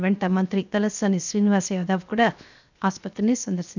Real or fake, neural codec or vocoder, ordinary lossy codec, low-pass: fake; codec, 16 kHz, 0.7 kbps, FocalCodec; none; 7.2 kHz